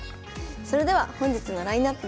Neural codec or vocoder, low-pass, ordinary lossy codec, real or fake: none; none; none; real